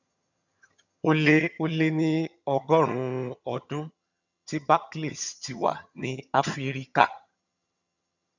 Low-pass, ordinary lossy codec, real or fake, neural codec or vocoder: 7.2 kHz; none; fake; vocoder, 22.05 kHz, 80 mel bands, HiFi-GAN